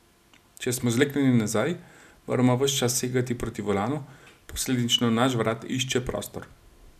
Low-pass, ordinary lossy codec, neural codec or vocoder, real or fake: 14.4 kHz; none; none; real